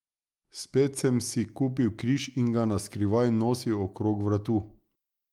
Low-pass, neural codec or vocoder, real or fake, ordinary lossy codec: 19.8 kHz; autoencoder, 48 kHz, 128 numbers a frame, DAC-VAE, trained on Japanese speech; fake; Opus, 32 kbps